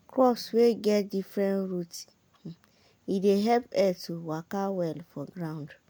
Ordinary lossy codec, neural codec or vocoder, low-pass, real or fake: none; none; none; real